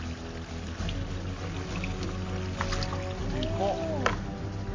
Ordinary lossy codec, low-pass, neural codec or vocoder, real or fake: MP3, 32 kbps; 7.2 kHz; none; real